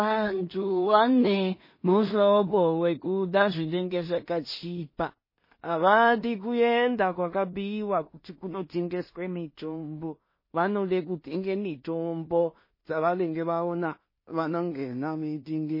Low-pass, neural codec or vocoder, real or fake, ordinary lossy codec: 5.4 kHz; codec, 16 kHz in and 24 kHz out, 0.4 kbps, LongCat-Audio-Codec, two codebook decoder; fake; MP3, 24 kbps